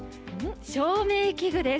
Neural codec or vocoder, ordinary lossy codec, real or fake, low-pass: none; none; real; none